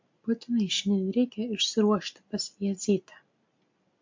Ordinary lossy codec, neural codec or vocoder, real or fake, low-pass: MP3, 48 kbps; none; real; 7.2 kHz